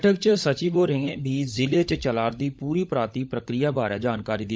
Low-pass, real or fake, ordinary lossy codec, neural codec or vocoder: none; fake; none; codec, 16 kHz, 16 kbps, FunCodec, trained on LibriTTS, 50 frames a second